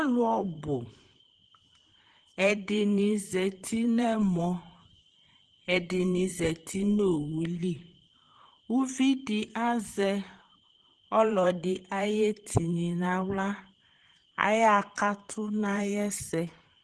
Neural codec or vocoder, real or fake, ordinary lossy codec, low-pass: vocoder, 44.1 kHz, 128 mel bands, Pupu-Vocoder; fake; Opus, 16 kbps; 10.8 kHz